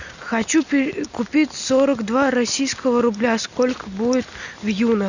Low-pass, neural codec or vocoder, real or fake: 7.2 kHz; none; real